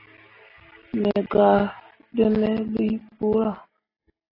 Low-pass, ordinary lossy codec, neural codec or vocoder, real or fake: 5.4 kHz; AAC, 24 kbps; none; real